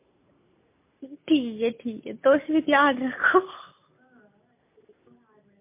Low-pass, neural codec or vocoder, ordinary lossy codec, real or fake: 3.6 kHz; none; MP3, 24 kbps; real